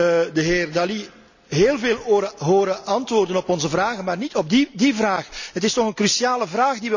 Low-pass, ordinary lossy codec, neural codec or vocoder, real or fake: 7.2 kHz; none; none; real